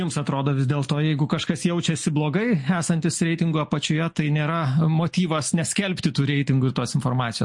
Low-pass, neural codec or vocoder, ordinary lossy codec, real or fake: 10.8 kHz; none; MP3, 48 kbps; real